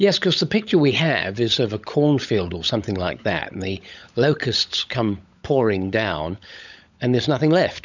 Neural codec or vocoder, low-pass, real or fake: codec, 16 kHz, 16 kbps, FunCodec, trained on LibriTTS, 50 frames a second; 7.2 kHz; fake